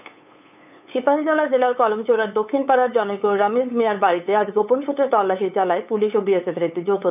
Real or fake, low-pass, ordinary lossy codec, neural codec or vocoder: fake; 3.6 kHz; none; codec, 16 kHz, 8 kbps, FunCodec, trained on LibriTTS, 25 frames a second